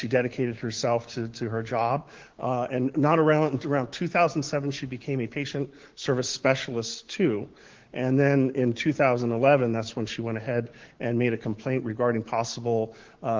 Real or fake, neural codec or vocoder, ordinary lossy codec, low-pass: fake; codec, 16 kHz, 6 kbps, DAC; Opus, 16 kbps; 7.2 kHz